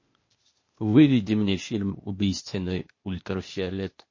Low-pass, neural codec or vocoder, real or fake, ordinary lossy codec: 7.2 kHz; codec, 16 kHz, 0.8 kbps, ZipCodec; fake; MP3, 32 kbps